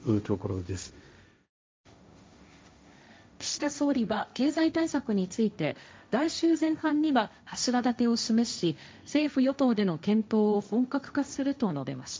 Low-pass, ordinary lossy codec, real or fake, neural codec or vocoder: none; none; fake; codec, 16 kHz, 1.1 kbps, Voila-Tokenizer